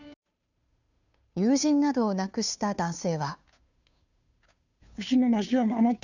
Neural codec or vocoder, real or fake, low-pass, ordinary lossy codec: codec, 16 kHz, 2 kbps, FunCodec, trained on Chinese and English, 25 frames a second; fake; 7.2 kHz; none